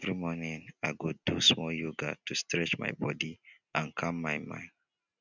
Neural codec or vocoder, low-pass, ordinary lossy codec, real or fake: none; 7.2 kHz; none; real